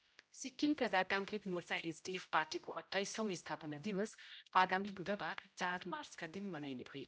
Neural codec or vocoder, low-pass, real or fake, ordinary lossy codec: codec, 16 kHz, 0.5 kbps, X-Codec, HuBERT features, trained on general audio; none; fake; none